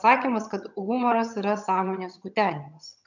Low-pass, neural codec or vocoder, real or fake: 7.2 kHz; vocoder, 22.05 kHz, 80 mel bands, HiFi-GAN; fake